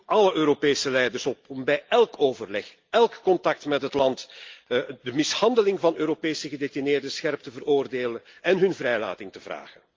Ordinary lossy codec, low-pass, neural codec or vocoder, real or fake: Opus, 32 kbps; 7.2 kHz; none; real